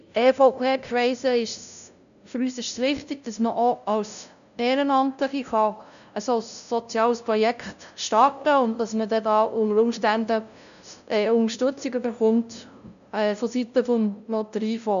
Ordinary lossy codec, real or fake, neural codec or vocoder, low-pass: none; fake; codec, 16 kHz, 0.5 kbps, FunCodec, trained on LibriTTS, 25 frames a second; 7.2 kHz